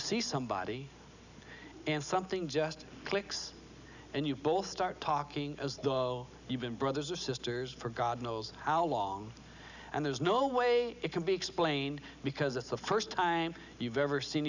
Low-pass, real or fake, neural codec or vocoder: 7.2 kHz; real; none